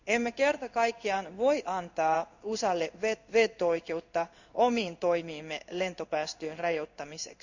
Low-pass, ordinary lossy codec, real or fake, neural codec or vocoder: 7.2 kHz; none; fake; codec, 16 kHz in and 24 kHz out, 1 kbps, XY-Tokenizer